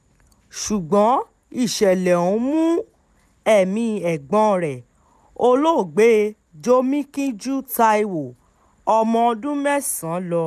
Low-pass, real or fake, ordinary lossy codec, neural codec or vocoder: 14.4 kHz; real; none; none